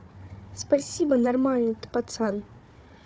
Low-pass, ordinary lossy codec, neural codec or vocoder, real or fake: none; none; codec, 16 kHz, 4 kbps, FunCodec, trained on Chinese and English, 50 frames a second; fake